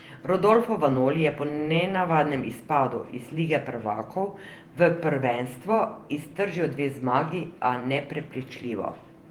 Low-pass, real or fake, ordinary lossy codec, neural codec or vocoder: 19.8 kHz; fake; Opus, 24 kbps; vocoder, 48 kHz, 128 mel bands, Vocos